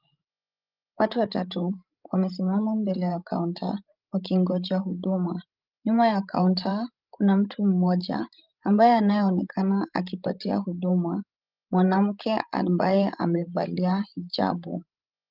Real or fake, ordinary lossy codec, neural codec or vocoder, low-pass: fake; Opus, 24 kbps; codec, 16 kHz, 16 kbps, FreqCodec, larger model; 5.4 kHz